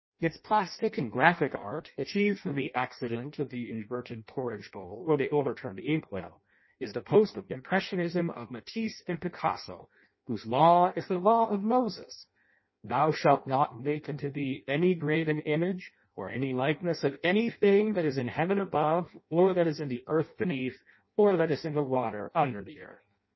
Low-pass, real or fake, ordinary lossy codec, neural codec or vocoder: 7.2 kHz; fake; MP3, 24 kbps; codec, 16 kHz in and 24 kHz out, 0.6 kbps, FireRedTTS-2 codec